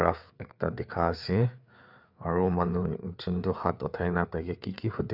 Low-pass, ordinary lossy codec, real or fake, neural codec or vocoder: 5.4 kHz; none; fake; codec, 16 kHz, 4 kbps, FreqCodec, larger model